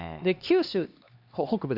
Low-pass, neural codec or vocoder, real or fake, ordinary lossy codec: 5.4 kHz; codec, 16 kHz, 2 kbps, X-Codec, HuBERT features, trained on LibriSpeech; fake; Opus, 64 kbps